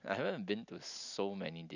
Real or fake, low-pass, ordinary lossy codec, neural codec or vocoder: real; 7.2 kHz; none; none